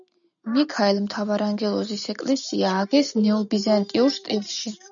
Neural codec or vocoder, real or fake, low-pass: none; real; 7.2 kHz